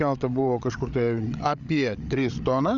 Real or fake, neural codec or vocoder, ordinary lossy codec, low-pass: fake; codec, 16 kHz, 8 kbps, FreqCodec, larger model; Opus, 64 kbps; 7.2 kHz